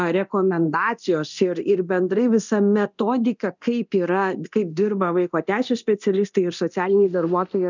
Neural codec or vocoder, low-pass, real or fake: codec, 24 kHz, 0.9 kbps, DualCodec; 7.2 kHz; fake